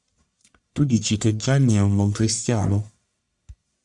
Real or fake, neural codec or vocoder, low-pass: fake; codec, 44.1 kHz, 1.7 kbps, Pupu-Codec; 10.8 kHz